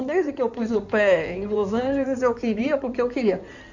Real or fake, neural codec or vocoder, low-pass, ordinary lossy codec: fake; codec, 16 kHz in and 24 kHz out, 2.2 kbps, FireRedTTS-2 codec; 7.2 kHz; none